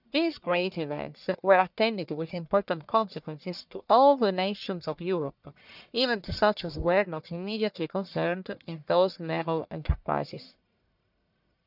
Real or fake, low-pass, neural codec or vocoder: fake; 5.4 kHz; codec, 44.1 kHz, 1.7 kbps, Pupu-Codec